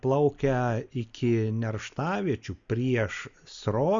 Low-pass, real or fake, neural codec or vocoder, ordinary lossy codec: 7.2 kHz; real; none; Opus, 64 kbps